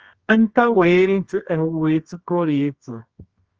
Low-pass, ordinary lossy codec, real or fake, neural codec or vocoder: 7.2 kHz; Opus, 24 kbps; fake; codec, 24 kHz, 0.9 kbps, WavTokenizer, medium music audio release